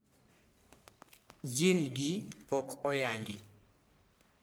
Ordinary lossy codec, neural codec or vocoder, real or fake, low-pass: none; codec, 44.1 kHz, 1.7 kbps, Pupu-Codec; fake; none